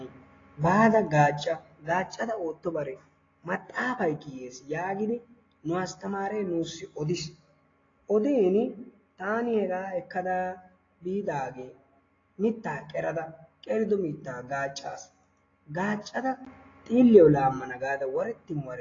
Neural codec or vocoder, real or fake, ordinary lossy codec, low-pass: none; real; AAC, 32 kbps; 7.2 kHz